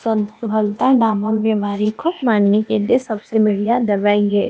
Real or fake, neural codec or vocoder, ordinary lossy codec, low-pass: fake; codec, 16 kHz, 0.8 kbps, ZipCodec; none; none